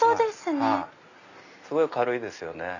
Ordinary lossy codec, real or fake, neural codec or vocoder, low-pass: none; real; none; 7.2 kHz